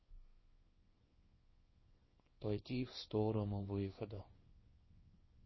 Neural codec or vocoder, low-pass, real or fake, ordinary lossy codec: codec, 24 kHz, 0.9 kbps, WavTokenizer, small release; 7.2 kHz; fake; MP3, 24 kbps